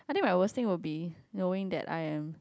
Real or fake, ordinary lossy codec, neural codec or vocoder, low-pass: real; none; none; none